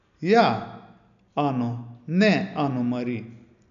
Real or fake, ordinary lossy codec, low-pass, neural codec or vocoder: real; none; 7.2 kHz; none